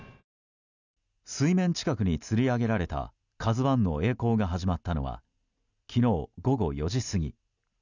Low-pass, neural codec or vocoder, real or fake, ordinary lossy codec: 7.2 kHz; none; real; none